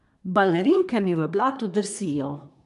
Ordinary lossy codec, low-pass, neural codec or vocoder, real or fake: none; 10.8 kHz; codec, 24 kHz, 1 kbps, SNAC; fake